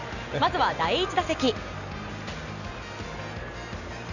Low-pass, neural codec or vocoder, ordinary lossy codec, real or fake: 7.2 kHz; none; none; real